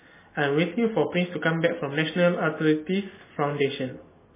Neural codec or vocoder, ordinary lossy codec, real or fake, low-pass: none; MP3, 16 kbps; real; 3.6 kHz